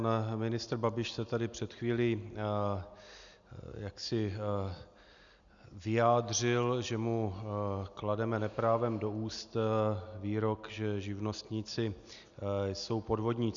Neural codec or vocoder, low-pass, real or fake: none; 7.2 kHz; real